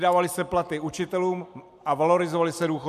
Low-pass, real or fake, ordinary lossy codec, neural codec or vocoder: 14.4 kHz; real; MP3, 96 kbps; none